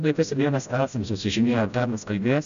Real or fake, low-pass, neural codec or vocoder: fake; 7.2 kHz; codec, 16 kHz, 0.5 kbps, FreqCodec, smaller model